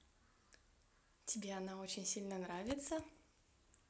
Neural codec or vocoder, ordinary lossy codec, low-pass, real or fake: none; none; none; real